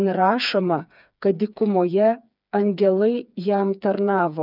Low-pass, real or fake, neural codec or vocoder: 5.4 kHz; fake; codec, 16 kHz, 4 kbps, FreqCodec, smaller model